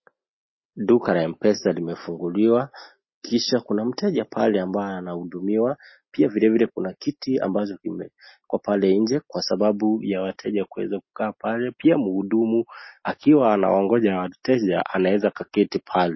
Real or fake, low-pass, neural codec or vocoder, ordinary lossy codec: real; 7.2 kHz; none; MP3, 24 kbps